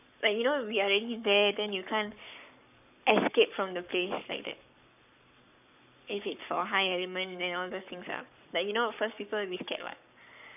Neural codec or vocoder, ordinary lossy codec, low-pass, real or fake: codec, 44.1 kHz, 7.8 kbps, Pupu-Codec; none; 3.6 kHz; fake